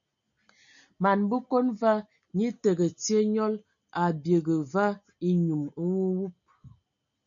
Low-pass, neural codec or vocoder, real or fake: 7.2 kHz; none; real